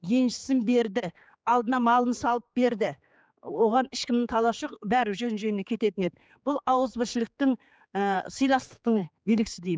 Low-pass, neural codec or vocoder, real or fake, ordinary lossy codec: none; codec, 16 kHz, 4 kbps, X-Codec, HuBERT features, trained on general audio; fake; none